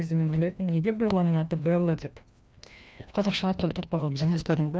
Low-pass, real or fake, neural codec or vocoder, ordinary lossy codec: none; fake; codec, 16 kHz, 1 kbps, FreqCodec, larger model; none